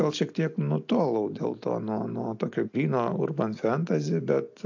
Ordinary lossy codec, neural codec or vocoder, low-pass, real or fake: AAC, 48 kbps; none; 7.2 kHz; real